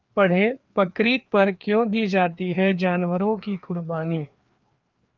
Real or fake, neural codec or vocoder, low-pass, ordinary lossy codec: fake; codec, 16 kHz, 2 kbps, FreqCodec, larger model; 7.2 kHz; Opus, 24 kbps